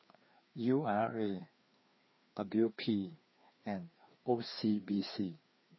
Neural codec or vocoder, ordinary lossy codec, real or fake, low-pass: codec, 16 kHz, 2 kbps, FreqCodec, larger model; MP3, 24 kbps; fake; 7.2 kHz